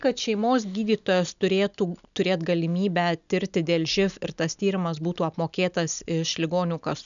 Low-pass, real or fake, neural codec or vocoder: 7.2 kHz; real; none